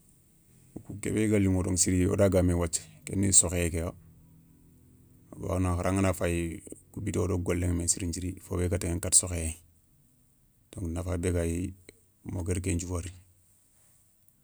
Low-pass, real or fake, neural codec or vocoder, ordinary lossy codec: none; real; none; none